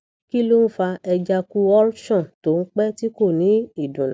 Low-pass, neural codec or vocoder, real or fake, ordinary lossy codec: none; none; real; none